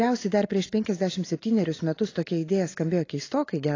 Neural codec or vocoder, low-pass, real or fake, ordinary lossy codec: none; 7.2 kHz; real; AAC, 32 kbps